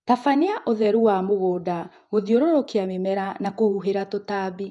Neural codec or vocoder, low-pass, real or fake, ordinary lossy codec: vocoder, 48 kHz, 128 mel bands, Vocos; 10.8 kHz; fake; none